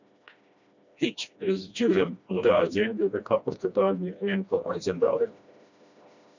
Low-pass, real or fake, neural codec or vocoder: 7.2 kHz; fake; codec, 16 kHz, 1 kbps, FreqCodec, smaller model